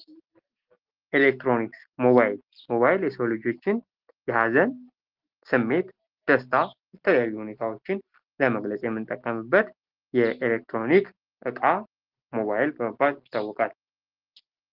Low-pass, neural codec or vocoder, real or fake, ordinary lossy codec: 5.4 kHz; none; real; Opus, 16 kbps